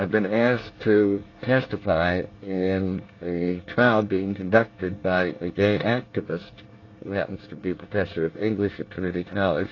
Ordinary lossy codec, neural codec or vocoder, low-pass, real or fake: AAC, 32 kbps; codec, 24 kHz, 1 kbps, SNAC; 7.2 kHz; fake